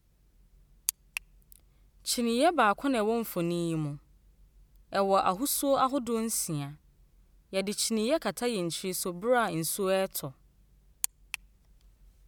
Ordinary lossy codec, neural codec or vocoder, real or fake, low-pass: none; none; real; none